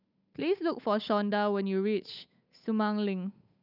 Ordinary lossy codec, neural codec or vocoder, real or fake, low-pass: none; codec, 16 kHz, 8 kbps, FunCodec, trained on Chinese and English, 25 frames a second; fake; 5.4 kHz